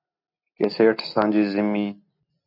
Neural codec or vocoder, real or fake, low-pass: none; real; 5.4 kHz